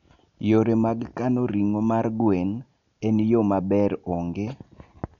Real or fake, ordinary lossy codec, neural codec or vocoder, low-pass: real; none; none; 7.2 kHz